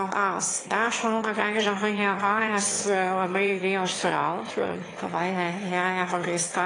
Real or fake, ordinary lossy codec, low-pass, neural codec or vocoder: fake; AAC, 32 kbps; 9.9 kHz; autoencoder, 22.05 kHz, a latent of 192 numbers a frame, VITS, trained on one speaker